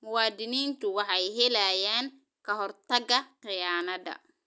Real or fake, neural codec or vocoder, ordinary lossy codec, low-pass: real; none; none; none